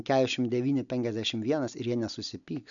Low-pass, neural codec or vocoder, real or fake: 7.2 kHz; none; real